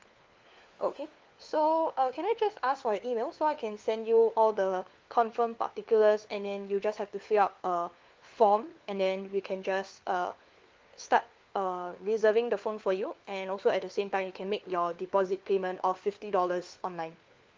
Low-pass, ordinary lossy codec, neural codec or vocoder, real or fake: 7.2 kHz; Opus, 32 kbps; codec, 16 kHz, 4 kbps, FunCodec, trained on LibriTTS, 50 frames a second; fake